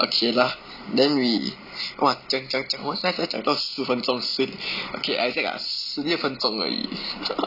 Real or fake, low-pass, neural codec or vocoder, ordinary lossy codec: real; 5.4 kHz; none; none